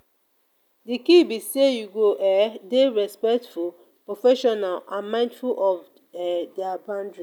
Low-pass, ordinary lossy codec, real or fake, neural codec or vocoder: 19.8 kHz; none; real; none